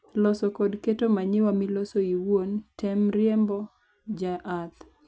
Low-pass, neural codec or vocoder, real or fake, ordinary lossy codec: none; none; real; none